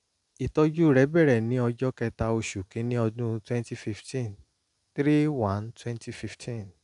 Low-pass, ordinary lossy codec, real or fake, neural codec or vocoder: 10.8 kHz; none; real; none